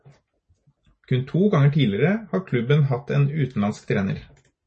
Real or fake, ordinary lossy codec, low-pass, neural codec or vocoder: real; MP3, 32 kbps; 10.8 kHz; none